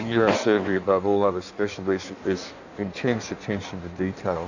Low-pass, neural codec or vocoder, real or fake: 7.2 kHz; codec, 16 kHz in and 24 kHz out, 1.1 kbps, FireRedTTS-2 codec; fake